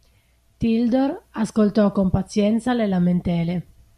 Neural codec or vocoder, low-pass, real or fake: none; 14.4 kHz; real